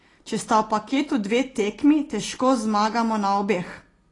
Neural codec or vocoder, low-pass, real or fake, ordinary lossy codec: none; 10.8 kHz; real; AAC, 32 kbps